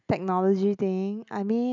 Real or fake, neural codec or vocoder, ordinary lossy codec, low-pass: real; none; none; 7.2 kHz